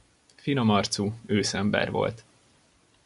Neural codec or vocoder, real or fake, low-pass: none; real; 10.8 kHz